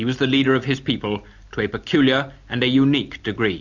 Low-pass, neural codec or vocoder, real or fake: 7.2 kHz; none; real